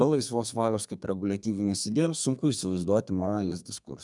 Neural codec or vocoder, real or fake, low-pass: codec, 32 kHz, 1.9 kbps, SNAC; fake; 10.8 kHz